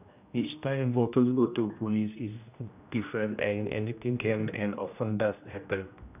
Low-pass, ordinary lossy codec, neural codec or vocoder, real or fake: 3.6 kHz; none; codec, 16 kHz, 1 kbps, X-Codec, HuBERT features, trained on general audio; fake